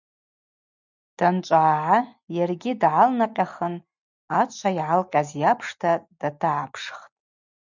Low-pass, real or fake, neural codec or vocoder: 7.2 kHz; real; none